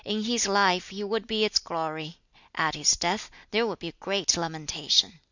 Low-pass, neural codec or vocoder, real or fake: 7.2 kHz; none; real